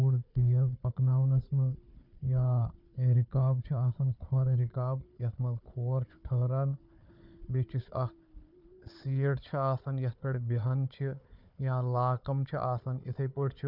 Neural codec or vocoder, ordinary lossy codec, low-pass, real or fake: codec, 16 kHz, 4 kbps, FunCodec, trained on Chinese and English, 50 frames a second; none; 5.4 kHz; fake